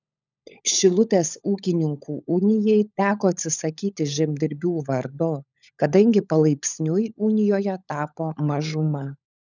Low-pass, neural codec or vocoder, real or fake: 7.2 kHz; codec, 16 kHz, 16 kbps, FunCodec, trained on LibriTTS, 50 frames a second; fake